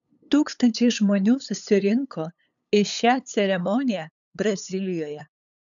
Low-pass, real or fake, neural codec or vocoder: 7.2 kHz; fake; codec, 16 kHz, 8 kbps, FunCodec, trained on LibriTTS, 25 frames a second